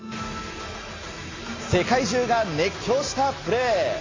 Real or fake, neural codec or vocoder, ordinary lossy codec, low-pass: real; none; AAC, 32 kbps; 7.2 kHz